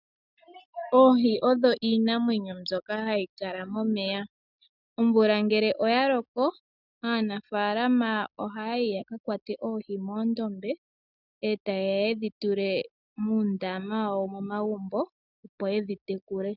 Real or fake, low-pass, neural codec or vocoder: real; 5.4 kHz; none